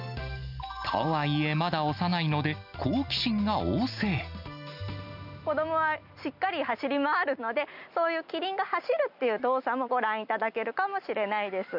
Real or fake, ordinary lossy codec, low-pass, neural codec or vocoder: real; none; 5.4 kHz; none